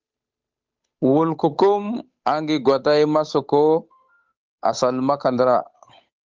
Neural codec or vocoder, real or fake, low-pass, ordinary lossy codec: codec, 16 kHz, 8 kbps, FunCodec, trained on Chinese and English, 25 frames a second; fake; 7.2 kHz; Opus, 24 kbps